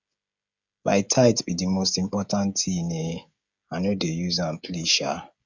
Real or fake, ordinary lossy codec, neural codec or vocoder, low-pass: fake; Opus, 64 kbps; codec, 16 kHz, 16 kbps, FreqCodec, smaller model; 7.2 kHz